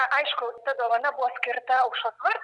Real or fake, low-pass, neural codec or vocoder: real; 10.8 kHz; none